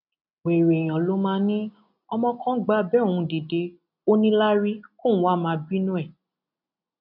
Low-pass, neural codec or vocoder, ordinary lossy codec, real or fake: 5.4 kHz; none; none; real